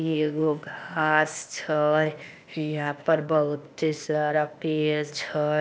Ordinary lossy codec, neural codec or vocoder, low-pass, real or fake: none; codec, 16 kHz, 0.8 kbps, ZipCodec; none; fake